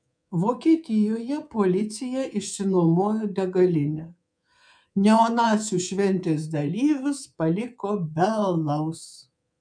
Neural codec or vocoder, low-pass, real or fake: codec, 24 kHz, 3.1 kbps, DualCodec; 9.9 kHz; fake